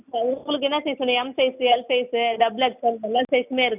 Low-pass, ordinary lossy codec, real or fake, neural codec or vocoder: 3.6 kHz; none; real; none